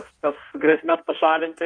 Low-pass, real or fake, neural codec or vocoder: 9.9 kHz; fake; codec, 16 kHz in and 24 kHz out, 1.1 kbps, FireRedTTS-2 codec